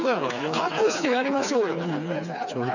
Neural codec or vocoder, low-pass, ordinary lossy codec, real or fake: codec, 16 kHz, 4 kbps, FreqCodec, smaller model; 7.2 kHz; none; fake